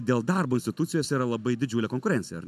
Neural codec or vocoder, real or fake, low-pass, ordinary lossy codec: none; real; 14.4 kHz; AAC, 96 kbps